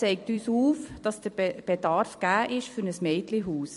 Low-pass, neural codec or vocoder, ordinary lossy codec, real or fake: 14.4 kHz; vocoder, 44.1 kHz, 128 mel bands every 256 samples, BigVGAN v2; MP3, 48 kbps; fake